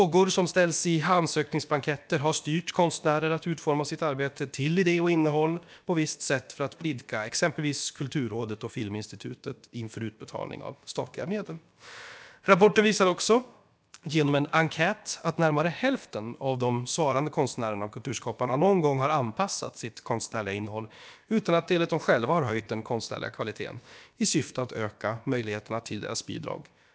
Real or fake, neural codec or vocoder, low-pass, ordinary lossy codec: fake; codec, 16 kHz, about 1 kbps, DyCAST, with the encoder's durations; none; none